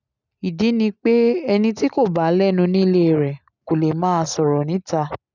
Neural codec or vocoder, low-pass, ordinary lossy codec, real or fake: none; 7.2 kHz; none; real